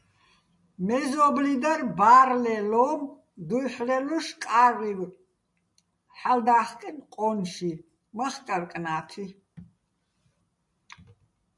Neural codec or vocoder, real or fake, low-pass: none; real; 10.8 kHz